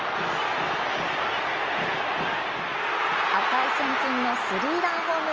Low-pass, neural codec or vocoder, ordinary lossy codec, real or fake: 7.2 kHz; none; Opus, 24 kbps; real